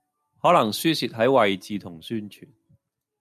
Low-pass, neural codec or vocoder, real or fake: 14.4 kHz; none; real